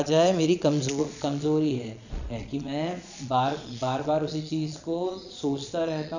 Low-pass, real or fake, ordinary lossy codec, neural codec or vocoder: 7.2 kHz; fake; none; vocoder, 22.05 kHz, 80 mel bands, Vocos